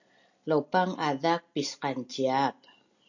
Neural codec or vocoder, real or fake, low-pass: none; real; 7.2 kHz